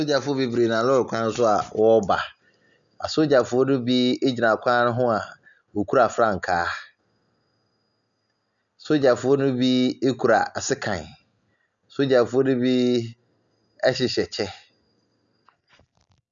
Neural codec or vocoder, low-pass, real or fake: none; 7.2 kHz; real